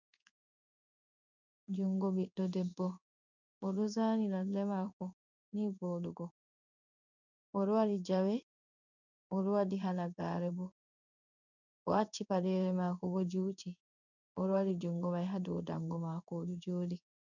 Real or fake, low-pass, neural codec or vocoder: fake; 7.2 kHz; codec, 16 kHz in and 24 kHz out, 1 kbps, XY-Tokenizer